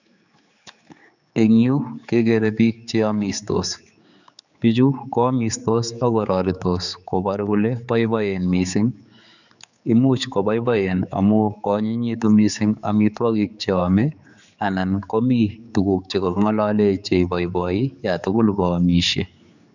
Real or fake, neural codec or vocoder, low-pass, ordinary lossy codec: fake; codec, 16 kHz, 4 kbps, X-Codec, HuBERT features, trained on general audio; 7.2 kHz; none